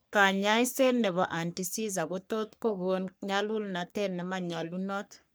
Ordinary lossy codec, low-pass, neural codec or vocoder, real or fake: none; none; codec, 44.1 kHz, 3.4 kbps, Pupu-Codec; fake